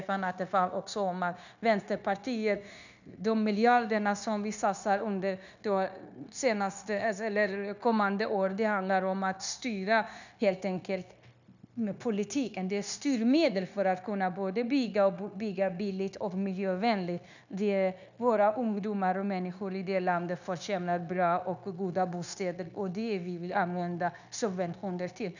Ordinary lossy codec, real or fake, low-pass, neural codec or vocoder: none; fake; 7.2 kHz; codec, 16 kHz, 0.9 kbps, LongCat-Audio-Codec